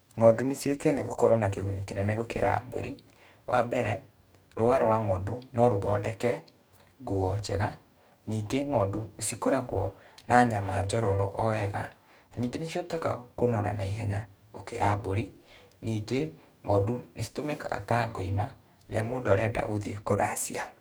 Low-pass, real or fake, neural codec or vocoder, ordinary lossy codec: none; fake; codec, 44.1 kHz, 2.6 kbps, DAC; none